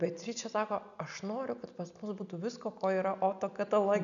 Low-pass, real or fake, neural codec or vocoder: 7.2 kHz; real; none